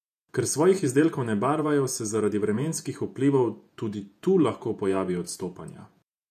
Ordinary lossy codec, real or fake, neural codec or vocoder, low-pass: none; fake; vocoder, 48 kHz, 128 mel bands, Vocos; 14.4 kHz